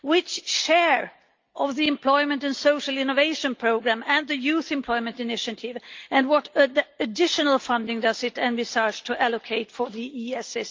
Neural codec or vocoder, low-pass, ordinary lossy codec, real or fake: none; 7.2 kHz; Opus, 24 kbps; real